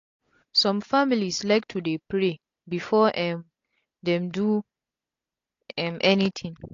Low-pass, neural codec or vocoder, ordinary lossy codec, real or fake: 7.2 kHz; none; AAC, 48 kbps; real